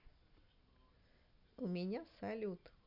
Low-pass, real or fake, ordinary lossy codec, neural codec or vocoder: 5.4 kHz; real; none; none